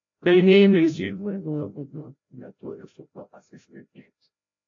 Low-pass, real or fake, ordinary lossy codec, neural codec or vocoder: 7.2 kHz; fake; AAC, 48 kbps; codec, 16 kHz, 0.5 kbps, FreqCodec, larger model